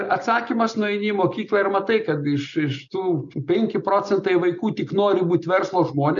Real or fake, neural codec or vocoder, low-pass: real; none; 7.2 kHz